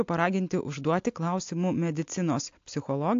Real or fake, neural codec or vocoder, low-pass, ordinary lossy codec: real; none; 7.2 kHz; AAC, 48 kbps